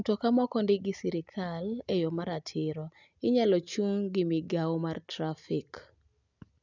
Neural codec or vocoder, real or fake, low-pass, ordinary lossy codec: none; real; 7.2 kHz; none